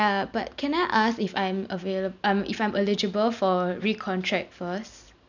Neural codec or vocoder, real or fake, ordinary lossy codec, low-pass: none; real; none; 7.2 kHz